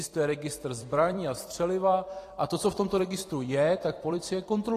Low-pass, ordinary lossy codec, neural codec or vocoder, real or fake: 14.4 kHz; AAC, 48 kbps; vocoder, 44.1 kHz, 128 mel bands every 256 samples, BigVGAN v2; fake